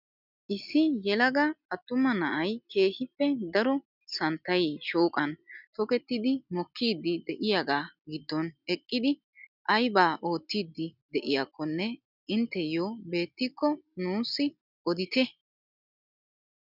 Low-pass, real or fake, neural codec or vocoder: 5.4 kHz; real; none